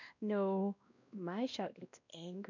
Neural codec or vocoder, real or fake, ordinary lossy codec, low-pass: codec, 16 kHz, 1 kbps, X-Codec, WavLM features, trained on Multilingual LibriSpeech; fake; none; 7.2 kHz